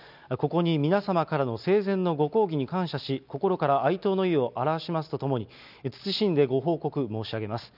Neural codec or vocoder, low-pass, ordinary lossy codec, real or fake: none; 5.4 kHz; none; real